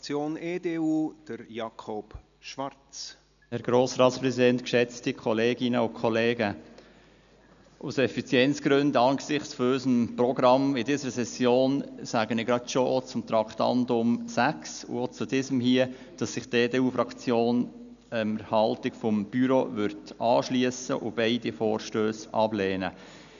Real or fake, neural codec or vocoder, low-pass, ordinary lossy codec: real; none; 7.2 kHz; none